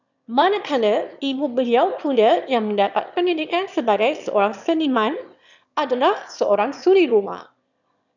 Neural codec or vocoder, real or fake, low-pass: autoencoder, 22.05 kHz, a latent of 192 numbers a frame, VITS, trained on one speaker; fake; 7.2 kHz